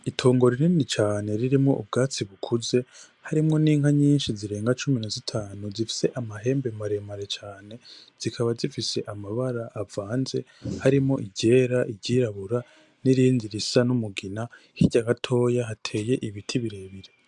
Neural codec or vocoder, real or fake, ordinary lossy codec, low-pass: none; real; MP3, 96 kbps; 10.8 kHz